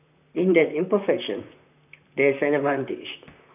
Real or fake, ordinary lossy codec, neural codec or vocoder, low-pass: fake; none; vocoder, 44.1 kHz, 128 mel bands, Pupu-Vocoder; 3.6 kHz